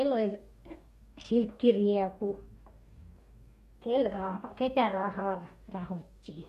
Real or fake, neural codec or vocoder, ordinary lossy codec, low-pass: fake; codec, 44.1 kHz, 2.6 kbps, DAC; MP3, 64 kbps; 14.4 kHz